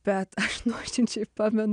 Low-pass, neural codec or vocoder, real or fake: 9.9 kHz; none; real